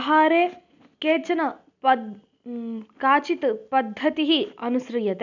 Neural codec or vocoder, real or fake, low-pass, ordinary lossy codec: none; real; 7.2 kHz; none